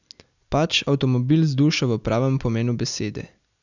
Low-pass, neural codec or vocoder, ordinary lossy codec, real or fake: 7.2 kHz; none; none; real